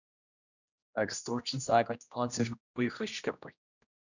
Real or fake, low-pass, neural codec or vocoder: fake; 7.2 kHz; codec, 16 kHz, 1 kbps, X-Codec, HuBERT features, trained on general audio